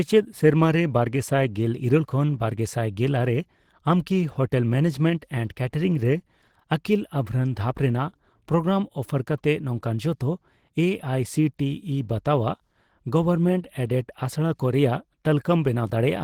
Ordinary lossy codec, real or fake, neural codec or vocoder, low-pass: Opus, 16 kbps; real; none; 19.8 kHz